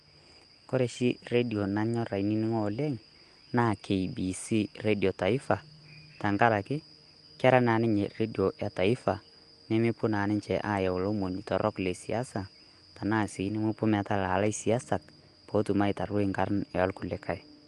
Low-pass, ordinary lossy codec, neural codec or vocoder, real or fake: 10.8 kHz; Opus, 24 kbps; none; real